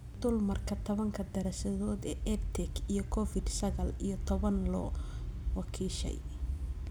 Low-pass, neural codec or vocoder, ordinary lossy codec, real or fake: none; none; none; real